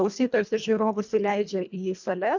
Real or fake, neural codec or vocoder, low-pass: fake; codec, 24 kHz, 1.5 kbps, HILCodec; 7.2 kHz